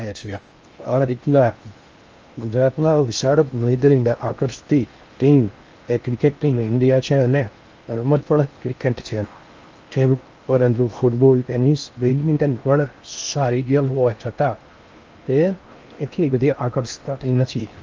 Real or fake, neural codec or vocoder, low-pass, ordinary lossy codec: fake; codec, 16 kHz in and 24 kHz out, 0.6 kbps, FocalCodec, streaming, 4096 codes; 7.2 kHz; Opus, 24 kbps